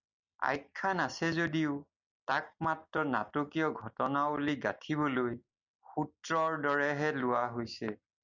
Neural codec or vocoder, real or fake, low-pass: none; real; 7.2 kHz